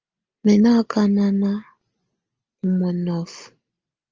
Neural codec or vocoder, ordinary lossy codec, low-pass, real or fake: none; Opus, 24 kbps; 7.2 kHz; real